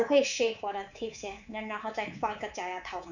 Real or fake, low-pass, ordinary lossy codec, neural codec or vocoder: fake; 7.2 kHz; none; codec, 24 kHz, 3.1 kbps, DualCodec